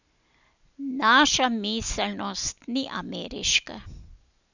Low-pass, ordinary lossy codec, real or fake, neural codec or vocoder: 7.2 kHz; none; real; none